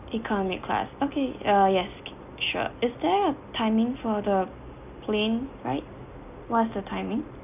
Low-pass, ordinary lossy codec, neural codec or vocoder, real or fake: 3.6 kHz; none; none; real